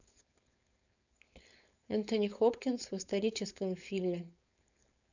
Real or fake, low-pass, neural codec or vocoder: fake; 7.2 kHz; codec, 16 kHz, 4.8 kbps, FACodec